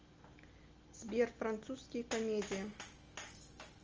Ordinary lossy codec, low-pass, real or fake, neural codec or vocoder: Opus, 24 kbps; 7.2 kHz; real; none